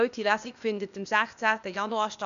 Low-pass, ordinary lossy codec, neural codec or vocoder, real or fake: 7.2 kHz; none; codec, 16 kHz, 0.8 kbps, ZipCodec; fake